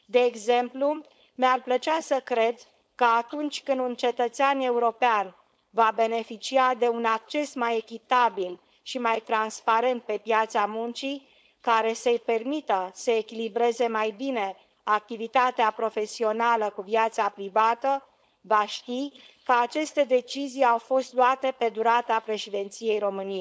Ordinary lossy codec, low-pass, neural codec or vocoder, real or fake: none; none; codec, 16 kHz, 4.8 kbps, FACodec; fake